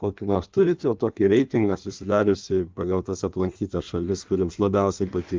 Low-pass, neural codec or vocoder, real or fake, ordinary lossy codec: 7.2 kHz; codec, 16 kHz in and 24 kHz out, 1.1 kbps, FireRedTTS-2 codec; fake; Opus, 32 kbps